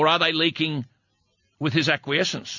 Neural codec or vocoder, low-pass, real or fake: none; 7.2 kHz; real